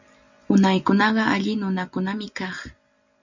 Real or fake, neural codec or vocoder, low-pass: real; none; 7.2 kHz